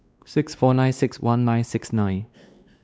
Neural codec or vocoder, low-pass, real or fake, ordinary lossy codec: codec, 16 kHz, 2 kbps, X-Codec, WavLM features, trained on Multilingual LibriSpeech; none; fake; none